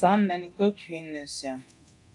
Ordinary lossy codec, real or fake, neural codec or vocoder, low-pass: MP3, 96 kbps; fake; codec, 24 kHz, 0.9 kbps, DualCodec; 10.8 kHz